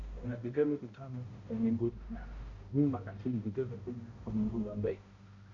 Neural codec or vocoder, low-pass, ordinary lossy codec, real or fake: codec, 16 kHz, 0.5 kbps, X-Codec, HuBERT features, trained on balanced general audio; 7.2 kHz; Opus, 64 kbps; fake